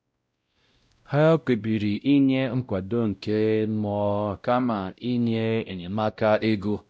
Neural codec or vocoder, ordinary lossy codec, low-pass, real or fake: codec, 16 kHz, 0.5 kbps, X-Codec, WavLM features, trained on Multilingual LibriSpeech; none; none; fake